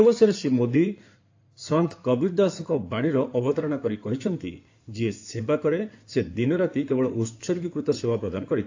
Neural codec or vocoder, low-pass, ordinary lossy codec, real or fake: codec, 16 kHz in and 24 kHz out, 2.2 kbps, FireRedTTS-2 codec; 7.2 kHz; AAC, 48 kbps; fake